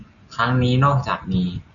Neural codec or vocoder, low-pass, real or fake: none; 7.2 kHz; real